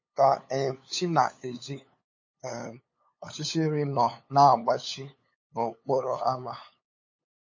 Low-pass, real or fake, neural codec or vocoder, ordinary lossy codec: 7.2 kHz; fake; codec, 16 kHz, 8 kbps, FunCodec, trained on LibriTTS, 25 frames a second; MP3, 32 kbps